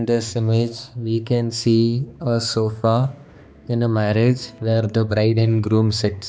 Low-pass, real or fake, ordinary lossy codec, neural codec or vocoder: none; fake; none; codec, 16 kHz, 2 kbps, X-Codec, HuBERT features, trained on balanced general audio